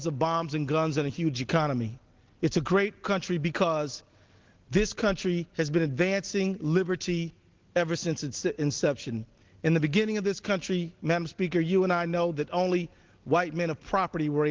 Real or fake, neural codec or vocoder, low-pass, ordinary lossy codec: real; none; 7.2 kHz; Opus, 16 kbps